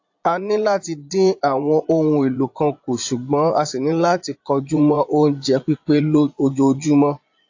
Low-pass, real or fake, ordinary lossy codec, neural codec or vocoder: 7.2 kHz; fake; AAC, 48 kbps; vocoder, 22.05 kHz, 80 mel bands, Vocos